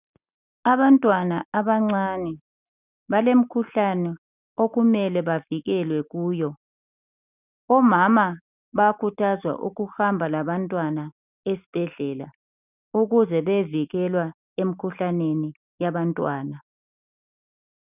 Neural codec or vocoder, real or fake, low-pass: none; real; 3.6 kHz